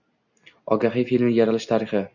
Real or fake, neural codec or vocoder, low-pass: real; none; 7.2 kHz